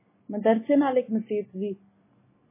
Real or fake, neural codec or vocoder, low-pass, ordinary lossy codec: real; none; 3.6 kHz; MP3, 16 kbps